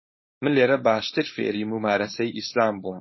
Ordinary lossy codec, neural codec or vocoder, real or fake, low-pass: MP3, 24 kbps; codec, 16 kHz, 4.8 kbps, FACodec; fake; 7.2 kHz